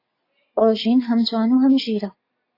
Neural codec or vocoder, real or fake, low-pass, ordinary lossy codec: none; real; 5.4 kHz; AAC, 32 kbps